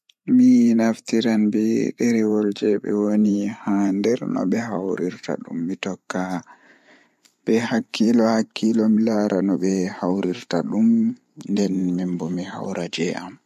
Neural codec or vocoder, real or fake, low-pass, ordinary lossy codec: vocoder, 44.1 kHz, 128 mel bands every 512 samples, BigVGAN v2; fake; 14.4 kHz; MP3, 64 kbps